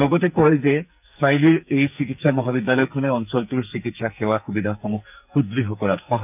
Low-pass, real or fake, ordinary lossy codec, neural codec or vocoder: 3.6 kHz; fake; none; codec, 44.1 kHz, 2.6 kbps, SNAC